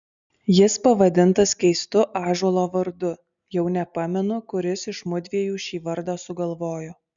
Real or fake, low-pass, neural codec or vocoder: real; 7.2 kHz; none